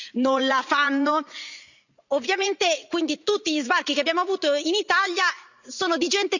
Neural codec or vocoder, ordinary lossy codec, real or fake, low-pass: vocoder, 44.1 kHz, 80 mel bands, Vocos; none; fake; 7.2 kHz